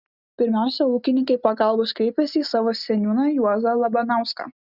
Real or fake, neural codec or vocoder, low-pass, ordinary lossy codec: real; none; 5.4 kHz; Opus, 64 kbps